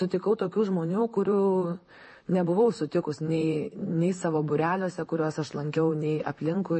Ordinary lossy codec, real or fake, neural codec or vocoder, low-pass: MP3, 32 kbps; fake; vocoder, 44.1 kHz, 128 mel bands, Pupu-Vocoder; 10.8 kHz